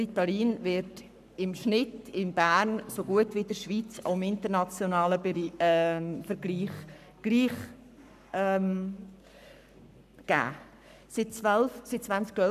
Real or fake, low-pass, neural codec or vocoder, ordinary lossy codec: fake; 14.4 kHz; codec, 44.1 kHz, 7.8 kbps, Pupu-Codec; none